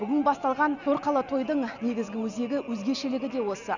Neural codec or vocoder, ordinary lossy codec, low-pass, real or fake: none; none; 7.2 kHz; real